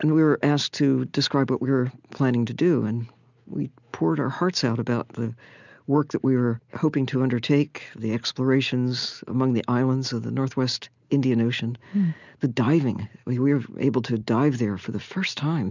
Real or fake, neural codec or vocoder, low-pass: real; none; 7.2 kHz